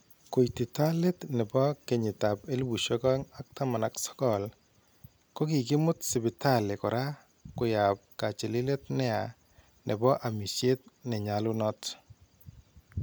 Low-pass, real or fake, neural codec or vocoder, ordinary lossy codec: none; real; none; none